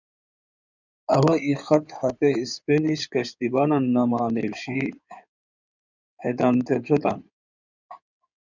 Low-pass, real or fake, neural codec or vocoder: 7.2 kHz; fake; codec, 16 kHz in and 24 kHz out, 2.2 kbps, FireRedTTS-2 codec